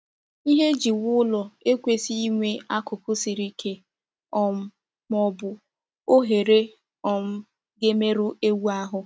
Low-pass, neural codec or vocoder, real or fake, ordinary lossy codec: none; none; real; none